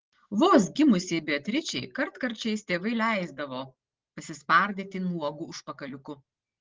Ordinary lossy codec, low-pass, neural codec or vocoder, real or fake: Opus, 16 kbps; 7.2 kHz; none; real